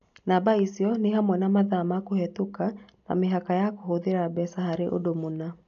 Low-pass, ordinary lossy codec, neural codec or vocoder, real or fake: 7.2 kHz; none; none; real